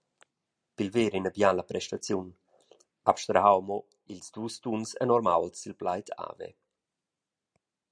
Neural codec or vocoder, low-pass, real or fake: none; 9.9 kHz; real